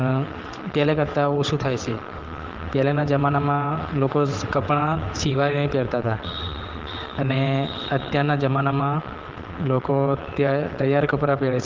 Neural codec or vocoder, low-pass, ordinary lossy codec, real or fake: vocoder, 22.05 kHz, 80 mel bands, WaveNeXt; 7.2 kHz; Opus, 16 kbps; fake